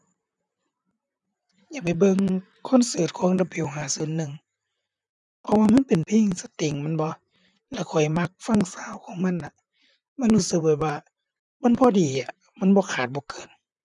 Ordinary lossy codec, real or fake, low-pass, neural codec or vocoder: none; fake; 10.8 kHz; vocoder, 44.1 kHz, 128 mel bands, Pupu-Vocoder